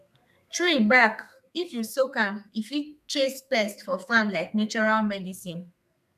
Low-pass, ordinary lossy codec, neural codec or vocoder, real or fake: 14.4 kHz; none; codec, 32 kHz, 1.9 kbps, SNAC; fake